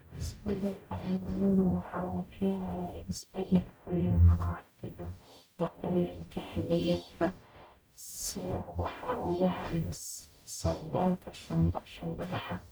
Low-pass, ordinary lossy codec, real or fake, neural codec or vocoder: none; none; fake; codec, 44.1 kHz, 0.9 kbps, DAC